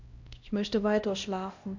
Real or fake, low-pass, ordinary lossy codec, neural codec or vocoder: fake; 7.2 kHz; MP3, 64 kbps; codec, 16 kHz, 1 kbps, X-Codec, HuBERT features, trained on LibriSpeech